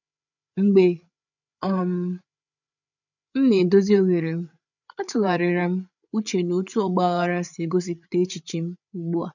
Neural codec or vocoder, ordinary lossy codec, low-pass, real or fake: codec, 16 kHz, 8 kbps, FreqCodec, larger model; none; 7.2 kHz; fake